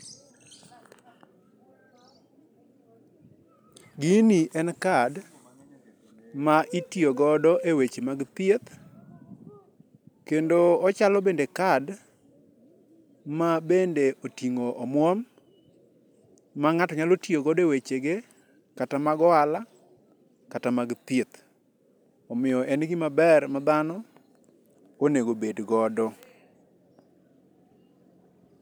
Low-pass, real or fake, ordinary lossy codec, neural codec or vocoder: none; real; none; none